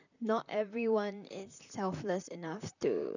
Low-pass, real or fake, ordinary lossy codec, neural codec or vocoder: 7.2 kHz; fake; none; vocoder, 44.1 kHz, 128 mel bands, Pupu-Vocoder